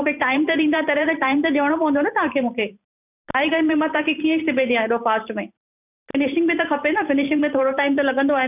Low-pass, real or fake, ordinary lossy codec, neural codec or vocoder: 3.6 kHz; fake; none; vocoder, 44.1 kHz, 128 mel bands every 512 samples, BigVGAN v2